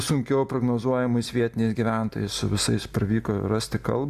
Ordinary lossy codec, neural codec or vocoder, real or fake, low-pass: Opus, 64 kbps; none; real; 14.4 kHz